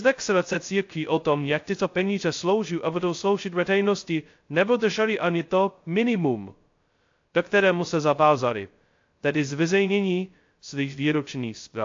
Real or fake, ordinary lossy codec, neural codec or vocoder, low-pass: fake; AAC, 48 kbps; codec, 16 kHz, 0.2 kbps, FocalCodec; 7.2 kHz